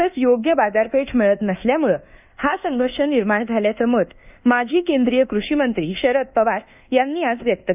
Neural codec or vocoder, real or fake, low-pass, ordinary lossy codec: codec, 24 kHz, 1.2 kbps, DualCodec; fake; 3.6 kHz; none